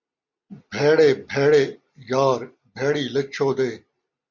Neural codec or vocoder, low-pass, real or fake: none; 7.2 kHz; real